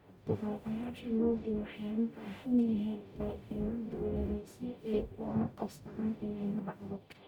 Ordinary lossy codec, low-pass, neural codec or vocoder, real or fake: none; 19.8 kHz; codec, 44.1 kHz, 0.9 kbps, DAC; fake